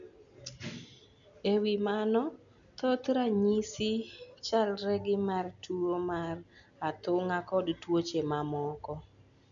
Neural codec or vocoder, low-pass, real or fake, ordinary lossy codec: none; 7.2 kHz; real; none